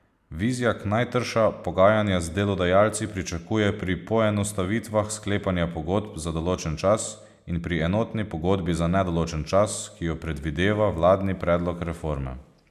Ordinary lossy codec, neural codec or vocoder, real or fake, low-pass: none; none; real; 14.4 kHz